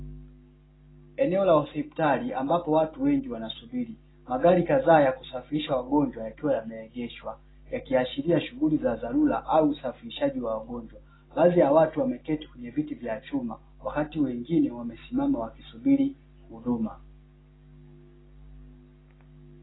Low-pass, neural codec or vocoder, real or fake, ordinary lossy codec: 7.2 kHz; none; real; AAC, 16 kbps